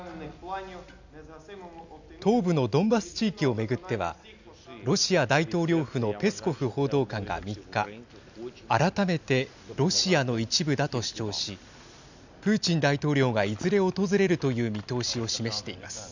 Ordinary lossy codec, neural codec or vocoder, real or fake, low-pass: none; none; real; 7.2 kHz